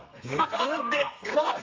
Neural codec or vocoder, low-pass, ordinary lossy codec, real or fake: codec, 32 kHz, 1.9 kbps, SNAC; 7.2 kHz; Opus, 32 kbps; fake